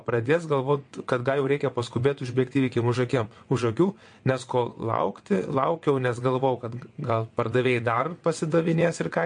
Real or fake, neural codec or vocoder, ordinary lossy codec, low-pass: fake; vocoder, 22.05 kHz, 80 mel bands, WaveNeXt; MP3, 48 kbps; 9.9 kHz